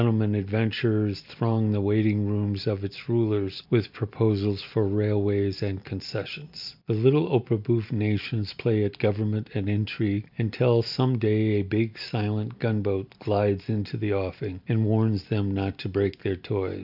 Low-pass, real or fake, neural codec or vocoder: 5.4 kHz; real; none